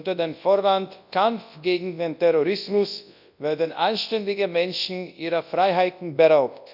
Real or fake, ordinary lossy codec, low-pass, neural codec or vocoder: fake; none; 5.4 kHz; codec, 24 kHz, 0.9 kbps, WavTokenizer, large speech release